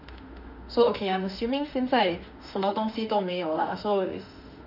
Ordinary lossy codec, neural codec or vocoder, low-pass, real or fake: none; autoencoder, 48 kHz, 32 numbers a frame, DAC-VAE, trained on Japanese speech; 5.4 kHz; fake